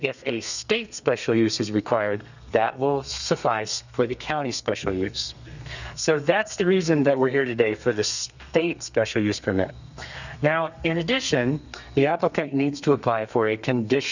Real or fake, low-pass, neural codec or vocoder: fake; 7.2 kHz; codec, 32 kHz, 1.9 kbps, SNAC